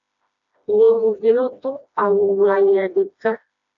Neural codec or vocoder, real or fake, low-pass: codec, 16 kHz, 1 kbps, FreqCodec, smaller model; fake; 7.2 kHz